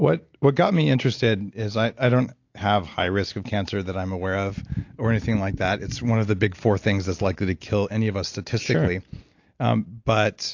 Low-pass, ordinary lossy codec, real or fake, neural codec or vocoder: 7.2 kHz; AAC, 48 kbps; real; none